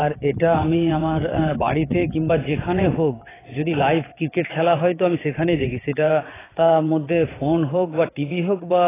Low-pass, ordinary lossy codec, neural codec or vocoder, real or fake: 3.6 kHz; AAC, 16 kbps; vocoder, 22.05 kHz, 80 mel bands, WaveNeXt; fake